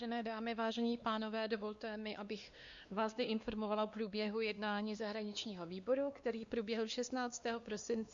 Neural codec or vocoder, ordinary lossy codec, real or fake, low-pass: codec, 16 kHz, 2 kbps, X-Codec, WavLM features, trained on Multilingual LibriSpeech; Opus, 64 kbps; fake; 7.2 kHz